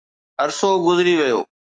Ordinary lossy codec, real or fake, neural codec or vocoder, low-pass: AAC, 64 kbps; fake; codec, 44.1 kHz, 7.8 kbps, DAC; 9.9 kHz